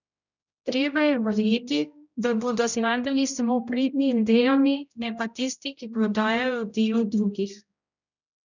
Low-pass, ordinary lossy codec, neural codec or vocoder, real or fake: 7.2 kHz; none; codec, 16 kHz, 0.5 kbps, X-Codec, HuBERT features, trained on general audio; fake